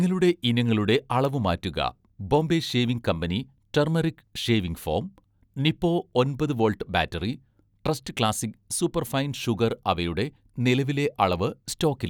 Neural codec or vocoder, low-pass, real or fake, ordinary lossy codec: none; 19.8 kHz; real; none